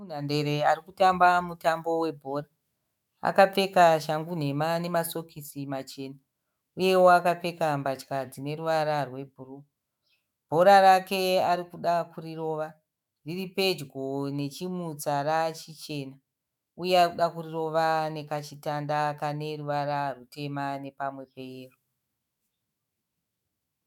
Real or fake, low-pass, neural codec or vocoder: fake; 19.8 kHz; autoencoder, 48 kHz, 128 numbers a frame, DAC-VAE, trained on Japanese speech